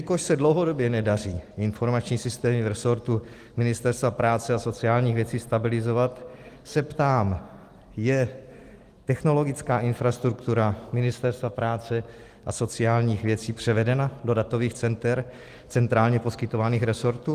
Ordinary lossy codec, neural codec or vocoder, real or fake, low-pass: Opus, 24 kbps; none; real; 14.4 kHz